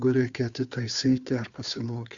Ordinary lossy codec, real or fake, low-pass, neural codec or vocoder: Opus, 64 kbps; fake; 7.2 kHz; codec, 16 kHz, 2 kbps, FunCodec, trained on Chinese and English, 25 frames a second